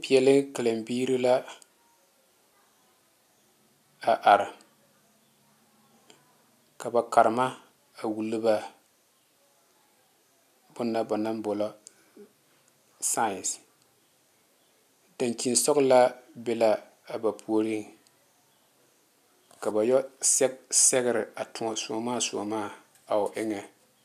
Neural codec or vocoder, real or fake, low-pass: none; real; 14.4 kHz